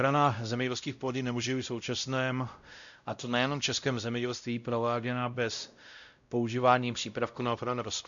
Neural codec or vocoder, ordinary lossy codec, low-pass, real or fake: codec, 16 kHz, 0.5 kbps, X-Codec, WavLM features, trained on Multilingual LibriSpeech; MP3, 96 kbps; 7.2 kHz; fake